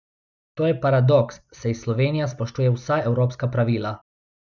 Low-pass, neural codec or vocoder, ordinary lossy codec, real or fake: 7.2 kHz; none; none; real